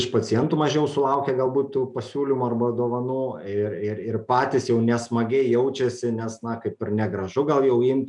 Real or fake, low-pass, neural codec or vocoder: real; 10.8 kHz; none